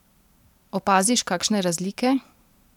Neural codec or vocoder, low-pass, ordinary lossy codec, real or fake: vocoder, 44.1 kHz, 128 mel bands every 512 samples, BigVGAN v2; 19.8 kHz; none; fake